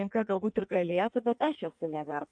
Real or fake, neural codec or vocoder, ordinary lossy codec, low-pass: fake; codec, 16 kHz in and 24 kHz out, 1.1 kbps, FireRedTTS-2 codec; AAC, 64 kbps; 9.9 kHz